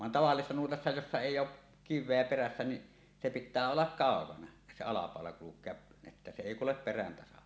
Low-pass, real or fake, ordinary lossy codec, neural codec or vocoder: none; real; none; none